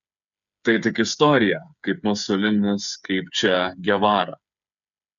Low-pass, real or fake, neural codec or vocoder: 7.2 kHz; fake; codec, 16 kHz, 8 kbps, FreqCodec, smaller model